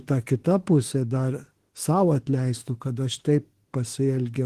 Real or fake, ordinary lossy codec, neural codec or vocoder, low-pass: fake; Opus, 16 kbps; autoencoder, 48 kHz, 32 numbers a frame, DAC-VAE, trained on Japanese speech; 14.4 kHz